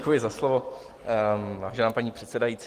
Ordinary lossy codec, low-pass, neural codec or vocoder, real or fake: Opus, 24 kbps; 14.4 kHz; none; real